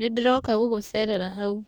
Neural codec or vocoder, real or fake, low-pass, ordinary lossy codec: codec, 44.1 kHz, 2.6 kbps, DAC; fake; 19.8 kHz; none